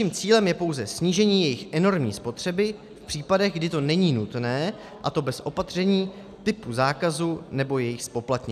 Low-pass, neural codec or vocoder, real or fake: 14.4 kHz; none; real